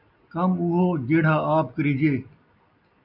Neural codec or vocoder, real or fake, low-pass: none; real; 5.4 kHz